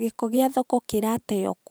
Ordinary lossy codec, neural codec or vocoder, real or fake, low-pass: none; vocoder, 44.1 kHz, 128 mel bands every 512 samples, BigVGAN v2; fake; none